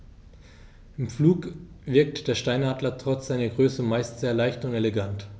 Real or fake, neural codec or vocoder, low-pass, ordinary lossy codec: real; none; none; none